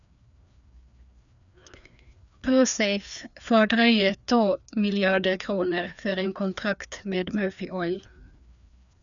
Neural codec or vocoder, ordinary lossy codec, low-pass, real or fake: codec, 16 kHz, 2 kbps, FreqCodec, larger model; none; 7.2 kHz; fake